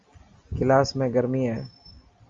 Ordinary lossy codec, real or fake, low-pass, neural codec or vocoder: Opus, 32 kbps; real; 7.2 kHz; none